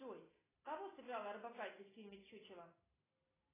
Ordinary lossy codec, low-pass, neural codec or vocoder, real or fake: AAC, 16 kbps; 3.6 kHz; none; real